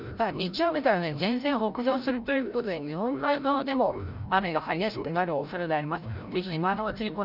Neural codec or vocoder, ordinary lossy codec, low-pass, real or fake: codec, 16 kHz, 0.5 kbps, FreqCodec, larger model; none; 5.4 kHz; fake